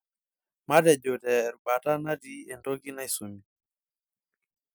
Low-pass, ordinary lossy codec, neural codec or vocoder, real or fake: none; none; none; real